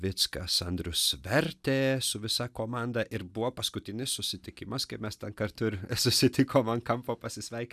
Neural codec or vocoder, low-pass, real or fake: none; 14.4 kHz; real